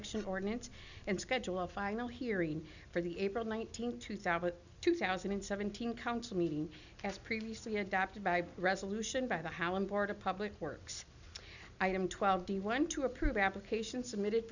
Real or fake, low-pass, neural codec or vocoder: real; 7.2 kHz; none